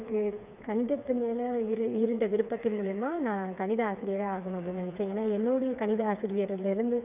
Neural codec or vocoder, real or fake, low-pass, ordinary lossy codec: codec, 16 kHz, 4 kbps, FreqCodec, smaller model; fake; 3.6 kHz; none